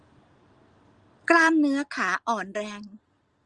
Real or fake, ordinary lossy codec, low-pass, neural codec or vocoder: real; Opus, 24 kbps; 9.9 kHz; none